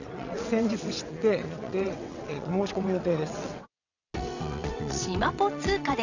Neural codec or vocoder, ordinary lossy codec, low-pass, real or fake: vocoder, 22.05 kHz, 80 mel bands, WaveNeXt; none; 7.2 kHz; fake